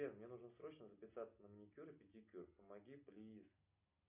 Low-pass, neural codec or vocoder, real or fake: 3.6 kHz; none; real